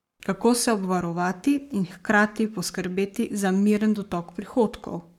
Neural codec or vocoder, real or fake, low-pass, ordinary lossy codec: codec, 44.1 kHz, 7.8 kbps, Pupu-Codec; fake; 19.8 kHz; none